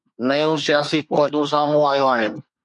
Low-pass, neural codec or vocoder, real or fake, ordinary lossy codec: 10.8 kHz; codec, 24 kHz, 1 kbps, SNAC; fake; MP3, 64 kbps